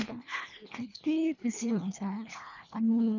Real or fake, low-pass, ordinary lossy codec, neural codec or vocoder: fake; 7.2 kHz; none; codec, 24 kHz, 1.5 kbps, HILCodec